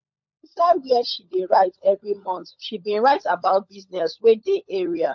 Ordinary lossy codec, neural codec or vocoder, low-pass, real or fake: MP3, 48 kbps; codec, 16 kHz, 16 kbps, FunCodec, trained on LibriTTS, 50 frames a second; 7.2 kHz; fake